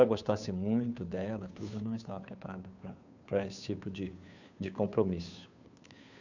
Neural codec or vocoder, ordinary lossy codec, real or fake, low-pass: codec, 16 kHz, 2 kbps, FunCodec, trained on Chinese and English, 25 frames a second; none; fake; 7.2 kHz